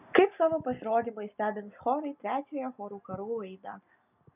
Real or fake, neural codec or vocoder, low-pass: real; none; 3.6 kHz